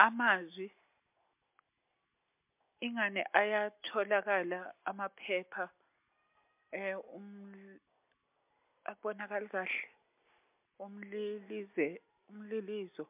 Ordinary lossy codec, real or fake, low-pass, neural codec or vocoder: MP3, 32 kbps; real; 3.6 kHz; none